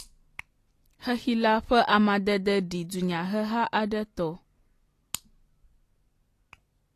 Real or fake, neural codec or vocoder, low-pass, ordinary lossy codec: real; none; 14.4 kHz; AAC, 48 kbps